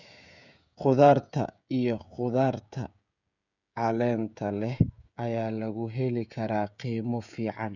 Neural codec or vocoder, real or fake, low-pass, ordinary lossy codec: codec, 16 kHz, 16 kbps, FreqCodec, smaller model; fake; 7.2 kHz; none